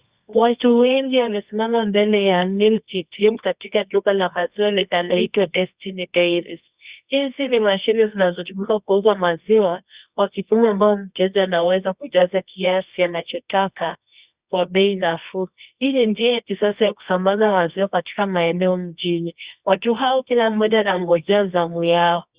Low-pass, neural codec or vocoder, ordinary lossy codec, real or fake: 3.6 kHz; codec, 24 kHz, 0.9 kbps, WavTokenizer, medium music audio release; Opus, 64 kbps; fake